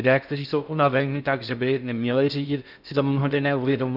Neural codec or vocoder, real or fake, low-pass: codec, 16 kHz in and 24 kHz out, 0.6 kbps, FocalCodec, streaming, 2048 codes; fake; 5.4 kHz